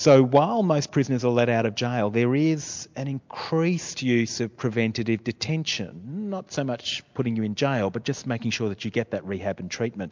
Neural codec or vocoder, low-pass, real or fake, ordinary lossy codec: none; 7.2 kHz; real; MP3, 64 kbps